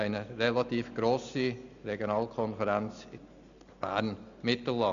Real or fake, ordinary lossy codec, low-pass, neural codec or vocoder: real; none; 7.2 kHz; none